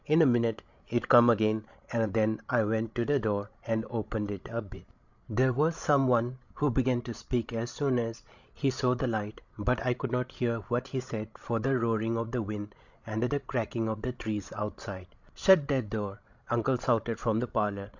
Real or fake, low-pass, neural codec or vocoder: fake; 7.2 kHz; codec, 16 kHz, 16 kbps, FreqCodec, larger model